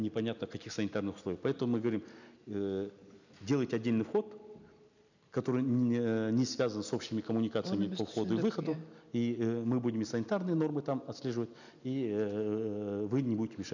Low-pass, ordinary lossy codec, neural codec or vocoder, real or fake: 7.2 kHz; none; none; real